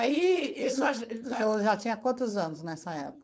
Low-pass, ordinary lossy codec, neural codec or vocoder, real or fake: none; none; codec, 16 kHz, 4.8 kbps, FACodec; fake